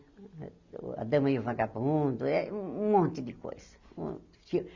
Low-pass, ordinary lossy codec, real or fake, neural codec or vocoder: 7.2 kHz; Opus, 64 kbps; real; none